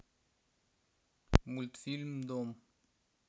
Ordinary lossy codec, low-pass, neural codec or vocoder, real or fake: none; none; none; real